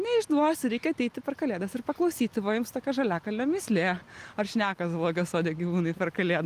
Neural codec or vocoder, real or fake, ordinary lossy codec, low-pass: none; real; Opus, 32 kbps; 14.4 kHz